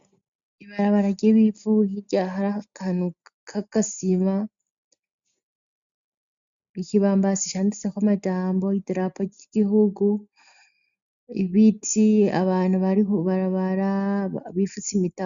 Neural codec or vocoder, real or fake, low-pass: none; real; 7.2 kHz